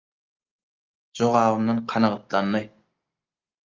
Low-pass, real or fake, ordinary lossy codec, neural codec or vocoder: 7.2 kHz; real; Opus, 24 kbps; none